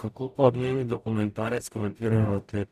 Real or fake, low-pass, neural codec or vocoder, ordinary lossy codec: fake; 14.4 kHz; codec, 44.1 kHz, 0.9 kbps, DAC; none